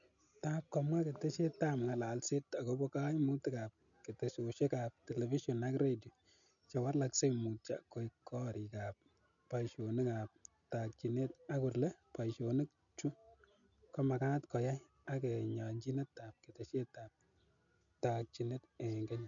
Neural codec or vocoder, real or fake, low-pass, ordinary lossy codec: none; real; 7.2 kHz; none